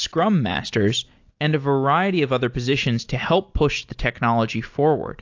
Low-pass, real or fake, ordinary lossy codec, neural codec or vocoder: 7.2 kHz; real; AAC, 48 kbps; none